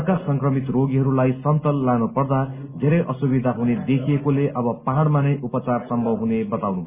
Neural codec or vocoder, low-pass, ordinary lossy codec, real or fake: none; 3.6 kHz; Opus, 64 kbps; real